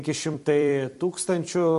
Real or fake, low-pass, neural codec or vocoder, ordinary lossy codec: fake; 14.4 kHz; vocoder, 44.1 kHz, 128 mel bands every 256 samples, BigVGAN v2; MP3, 48 kbps